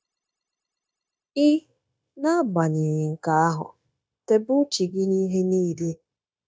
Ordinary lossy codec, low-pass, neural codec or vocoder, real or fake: none; none; codec, 16 kHz, 0.9 kbps, LongCat-Audio-Codec; fake